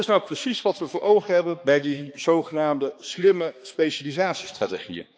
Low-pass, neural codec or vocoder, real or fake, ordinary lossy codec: none; codec, 16 kHz, 2 kbps, X-Codec, HuBERT features, trained on balanced general audio; fake; none